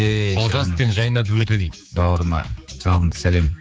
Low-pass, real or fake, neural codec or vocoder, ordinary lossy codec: none; fake; codec, 16 kHz, 4 kbps, X-Codec, HuBERT features, trained on general audio; none